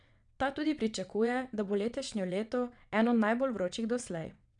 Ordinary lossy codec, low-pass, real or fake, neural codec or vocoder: none; 9.9 kHz; fake; vocoder, 22.05 kHz, 80 mel bands, WaveNeXt